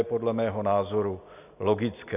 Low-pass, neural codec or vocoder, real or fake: 3.6 kHz; none; real